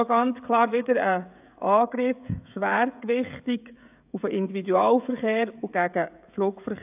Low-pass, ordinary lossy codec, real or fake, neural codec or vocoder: 3.6 kHz; AAC, 32 kbps; fake; codec, 16 kHz, 16 kbps, FreqCodec, smaller model